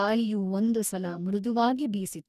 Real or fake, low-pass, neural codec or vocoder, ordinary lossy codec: fake; 14.4 kHz; codec, 44.1 kHz, 2.6 kbps, DAC; none